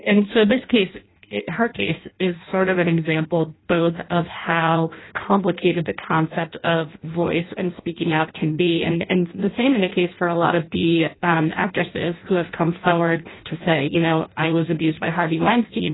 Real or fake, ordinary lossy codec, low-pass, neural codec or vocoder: fake; AAC, 16 kbps; 7.2 kHz; codec, 16 kHz in and 24 kHz out, 0.6 kbps, FireRedTTS-2 codec